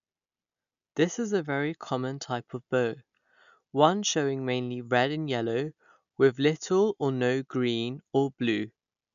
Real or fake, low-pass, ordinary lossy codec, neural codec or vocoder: real; 7.2 kHz; none; none